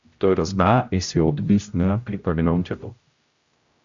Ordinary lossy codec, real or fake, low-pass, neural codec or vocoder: Opus, 64 kbps; fake; 7.2 kHz; codec, 16 kHz, 0.5 kbps, X-Codec, HuBERT features, trained on general audio